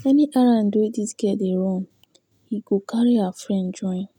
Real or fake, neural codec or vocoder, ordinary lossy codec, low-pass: real; none; none; 19.8 kHz